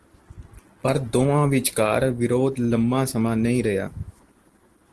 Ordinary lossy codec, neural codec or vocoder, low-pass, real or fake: Opus, 16 kbps; none; 10.8 kHz; real